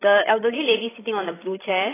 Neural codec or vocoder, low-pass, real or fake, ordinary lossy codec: codec, 16 kHz, 16 kbps, FreqCodec, larger model; 3.6 kHz; fake; AAC, 16 kbps